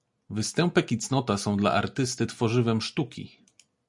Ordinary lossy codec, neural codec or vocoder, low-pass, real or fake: MP3, 96 kbps; none; 10.8 kHz; real